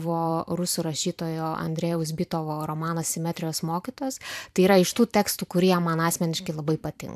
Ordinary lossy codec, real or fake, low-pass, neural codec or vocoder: AAC, 96 kbps; fake; 14.4 kHz; vocoder, 44.1 kHz, 128 mel bands every 256 samples, BigVGAN v2